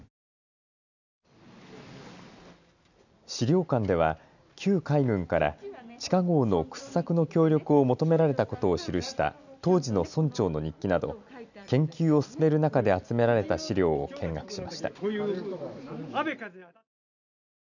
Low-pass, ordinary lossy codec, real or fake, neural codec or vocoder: 7.2 kHz; none; real; none